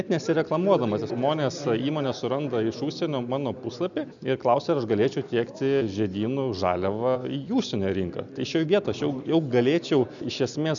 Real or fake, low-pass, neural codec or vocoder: real; 7.2 kHz; none